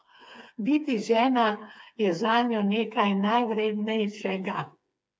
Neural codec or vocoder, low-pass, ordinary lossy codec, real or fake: codec, 16 kHz, 4 kbps, FreqCodec, smaller model; none; none; fake